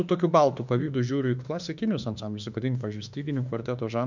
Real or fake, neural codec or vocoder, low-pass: fake; autoencoder, 48 kHz, 32 numbers a frame, DAC-VAE, trained on Japanese speech; 7.2 kHz